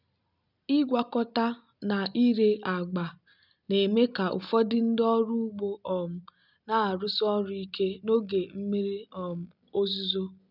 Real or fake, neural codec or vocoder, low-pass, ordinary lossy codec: real; none; 5.4 kHz; none